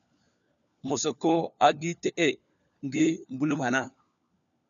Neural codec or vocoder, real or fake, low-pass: codec, 16 kHz, 4 kbps, FunCodec, trained on LibriTTS, 50 frames a second; fake; 7.2 kHz